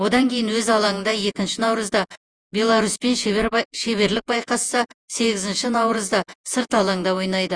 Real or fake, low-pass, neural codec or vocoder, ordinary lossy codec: fake; 9.9 kHz; vocoder, 48 kHz, 128 mel bands, Vocos; Opus, 32 kbps